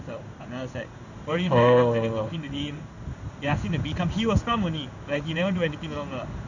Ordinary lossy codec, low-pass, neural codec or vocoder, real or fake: none; 7.2 kHz; codec, 16 kHz in and 24 kHz out, 1 kbps, XY-Tokenizer; fake